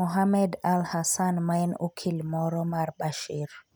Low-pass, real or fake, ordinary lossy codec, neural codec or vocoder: none; real; none; none